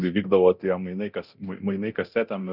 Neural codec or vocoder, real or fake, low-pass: codec, 24 kHz, 0.9 kbps, DualCodec; fake; 5.4 kHz